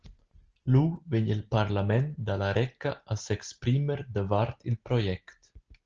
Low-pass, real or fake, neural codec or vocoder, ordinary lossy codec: 7.2 kHz; real; none; Opus, 16 kbps